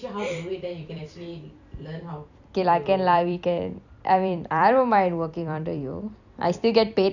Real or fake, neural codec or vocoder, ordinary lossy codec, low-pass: fake; vocoder, 44.1 kHz, 128 mel bands every 256 samples, BigVGAN v2; none; 7.2 kHz